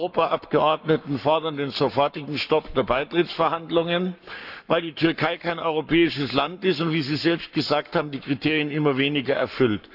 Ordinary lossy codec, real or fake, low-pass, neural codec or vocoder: none; fake; 5.4 kHz; codec, 44.1 kHz, 7.8 kbps, Pupu-Codec